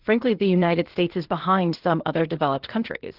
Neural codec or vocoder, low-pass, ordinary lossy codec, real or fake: codec, 16 kHz, 0.8 kbps, ZipCodec; 5.4 kHz; Opus, 16 kbps; fake